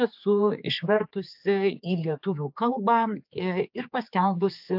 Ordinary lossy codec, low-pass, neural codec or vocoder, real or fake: AAC, 48 kbps; 5.4 kHz; codec, 16 kHz, 4 kbps, X-Codec, HuBERT features, trained on general audio; fake